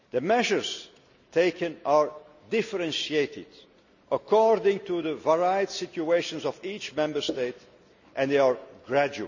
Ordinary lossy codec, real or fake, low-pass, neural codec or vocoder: none; real; 7.2 kHz; none